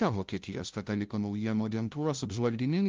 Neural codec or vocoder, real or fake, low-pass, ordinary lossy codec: codec, 16 kHz, 0.5 kbps, FunCodec, trained on LibriTTS, 25 frames a second; fake; 7.2 kHz; Opus, 32 kbps